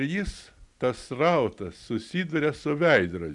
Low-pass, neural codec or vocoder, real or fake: 10.8 kHz; none; real